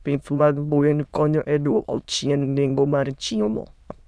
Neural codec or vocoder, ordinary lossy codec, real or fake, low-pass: autoencoder, 22.05 kHz, a latent of 192 numbers a frame, VITS, trained on many speakers; none; fake; none